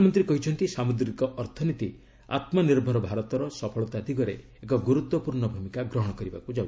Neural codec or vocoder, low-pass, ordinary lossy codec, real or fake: none; none; none; real